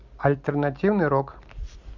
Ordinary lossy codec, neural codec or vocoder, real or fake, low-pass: MP3, 64 kbps; none; real; 7.2 kHz